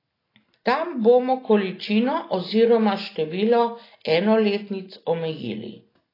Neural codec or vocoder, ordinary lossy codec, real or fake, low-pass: none; AAC, 24 kbps; real; 5.4 kHz